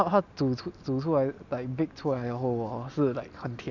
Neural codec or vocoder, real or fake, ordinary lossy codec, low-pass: none; real; none; 7.2 kHz